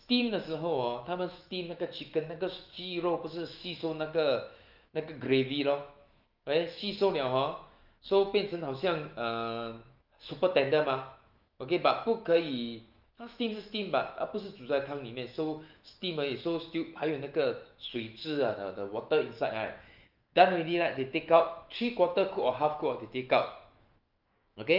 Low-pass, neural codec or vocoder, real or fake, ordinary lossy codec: 5.4 kHz; autoencoder, 48 kHz, 128 numbers a frame, DAC-VAE, trained on Japanese speech; fake; Opus, 32 kbps